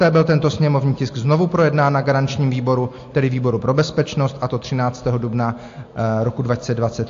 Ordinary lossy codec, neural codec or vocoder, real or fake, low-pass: AAC, 48 kbps; none; real; 7.2 kHz